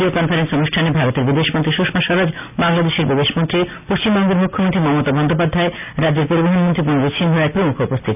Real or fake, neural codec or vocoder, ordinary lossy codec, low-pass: real; none; none; 3.6 kHz